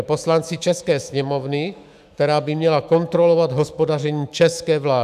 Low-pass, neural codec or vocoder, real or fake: 14.4 kHz; autoencoder, 48 kHz, 128 numbers a frame, DAC-VAE, trained on Japanese speech; fake